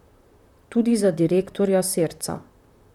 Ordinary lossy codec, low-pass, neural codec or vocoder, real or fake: none; 19.8 kHz; vocoder, 44.1 kHz, 128 mel bands, Pupu-Vocoder; fake